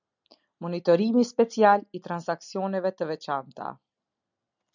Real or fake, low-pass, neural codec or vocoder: real; 7.2 kHz; none